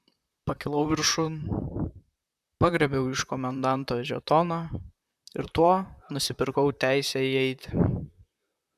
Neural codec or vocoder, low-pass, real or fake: vocoder, 44.1 kHz, 128 mel bands, Pupu-Vocoder; 14.4 kHz; fake